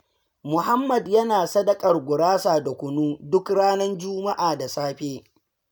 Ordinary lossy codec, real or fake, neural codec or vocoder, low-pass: none; real; none; none